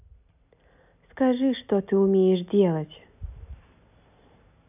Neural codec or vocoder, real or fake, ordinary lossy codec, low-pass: none; real; none; 3.6 kHz